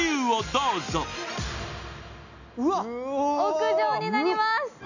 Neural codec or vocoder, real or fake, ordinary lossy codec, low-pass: none; real; none; 7.2 kHz